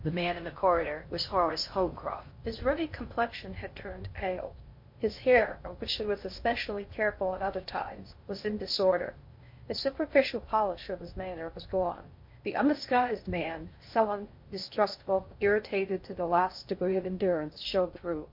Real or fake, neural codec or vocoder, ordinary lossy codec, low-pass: fake; codec, 16 kHz in and 24 kHz out, 0.6 kbps, FocalCodec, streaming, 4096 codes; MP3, 32 kbps; 5.4 kHz